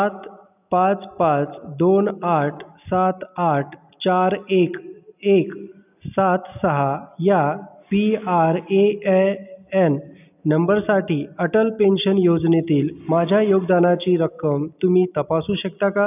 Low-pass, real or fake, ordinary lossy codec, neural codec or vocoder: 3.6 kHz; real; none; none